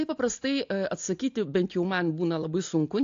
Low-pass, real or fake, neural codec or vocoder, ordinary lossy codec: 7.2 kHz; real; none; AAC, 48 kbps